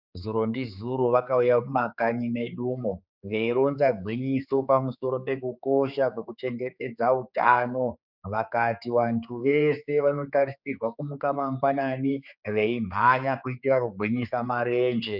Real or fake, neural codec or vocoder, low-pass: fake; codec, 16 kHz, 4 kbps, X-Codec, HuBERT features, trained on general audio; 5.4 kHz